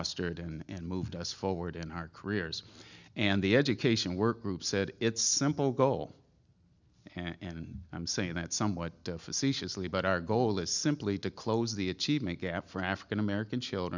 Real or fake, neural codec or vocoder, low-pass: real; none; 7.2 kHz